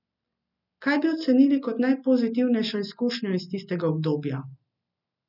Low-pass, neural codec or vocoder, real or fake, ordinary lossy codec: 5.4 kHz; none; real; none